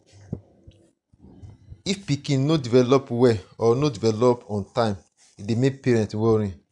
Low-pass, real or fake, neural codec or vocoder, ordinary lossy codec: 10.8 kHz; real; none; none